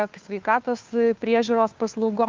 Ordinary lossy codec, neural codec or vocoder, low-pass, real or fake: Opus, 24 kbps; codec, 16 kHz, 2 kbps, FunCodec, trained on Chinese and English, 25 frames a second; 7.2 kHz; fake